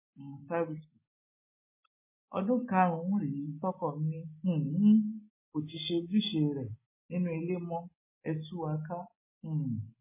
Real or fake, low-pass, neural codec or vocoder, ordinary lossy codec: real; 3.6 kHz; none; MP3, 16 kbps